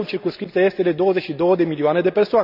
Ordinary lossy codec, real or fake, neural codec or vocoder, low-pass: none; real; none; 5.4 kHz